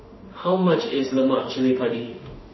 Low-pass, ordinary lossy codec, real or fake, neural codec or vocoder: 7.2 kHz; MP3, 24 kbps; fake; autoencoder, 48 kHz, 32 numbers a frame, DAC-VAE, trained on Japanese speech